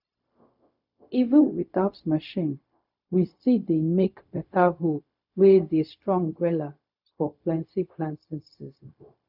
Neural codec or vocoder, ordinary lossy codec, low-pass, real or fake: codec, 16 kHz, 0.4 kbps, LongCat-Audio-Codec; none; 5.4 kHz; fake